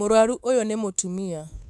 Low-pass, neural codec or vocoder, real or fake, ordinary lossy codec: none; codec, 24 kHz, 3.1 kbps, DualCodec; fake; none